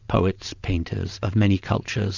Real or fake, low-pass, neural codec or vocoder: fake; 7.2 kHz; vocoder, 22.05 kHz, 80 mel bands, WaveNeXt